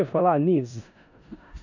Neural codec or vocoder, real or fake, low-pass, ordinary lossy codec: codec, 16 kHz in and 24 kHz out, 0.4 kbps, LongCat-Audio-Codec, four codebook decoder; fake; 7.2 kHz; none